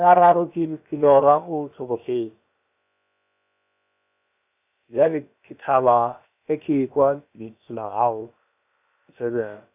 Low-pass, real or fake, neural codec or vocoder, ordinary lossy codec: 3.6 kHz; fake; codec, 16 kHz, about 1 kbps, DyCAST, with the encoder's durations; none